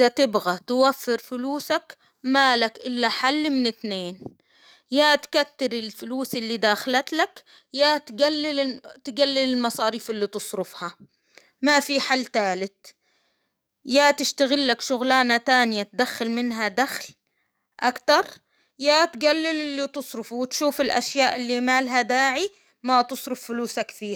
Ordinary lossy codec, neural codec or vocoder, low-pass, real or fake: none; codec, 44.1 kHz, 7.8 kbps, DAC; none; fake